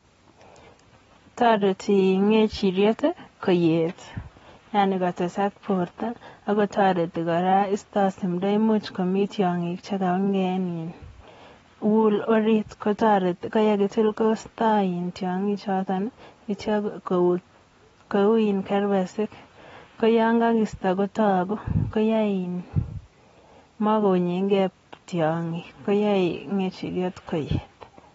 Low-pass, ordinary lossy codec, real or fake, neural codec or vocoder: 19.8 kHz; AAC, 24 kbps; real; none